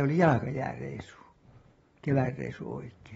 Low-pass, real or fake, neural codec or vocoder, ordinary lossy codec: 10.8 kHz; real; none; AAC, 24 kbps